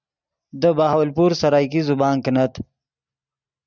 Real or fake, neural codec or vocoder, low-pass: real; none; 7.2 kHz